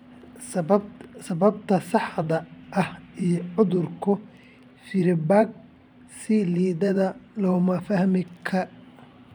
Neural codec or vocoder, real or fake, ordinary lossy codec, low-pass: vocoder, 44.1 kHz, 128 mel bands every 512 samples, BigVGAN v2; fake; none; 19.8 kHz